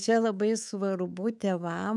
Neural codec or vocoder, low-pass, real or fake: codec, 24 kHz, 3.1 kbps, DualCodec; 10.8 kHz; fake